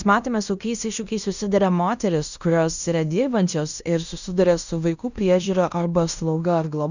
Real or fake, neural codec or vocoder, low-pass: fake; codec, 16 kHz in and 24 kHz out, 0.9 kbps, LongCat-Audio-Codec, fine tuned four codebook decoder; 7.2 kHz